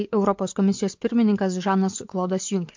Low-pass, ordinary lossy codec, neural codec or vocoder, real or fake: 7.2 kHz; MP3, 48 kbps; vocoder, 24 kHz, 100 mel bands, Vocos; fake